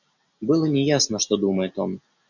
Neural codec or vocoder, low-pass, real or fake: none; 7.2 kHz; real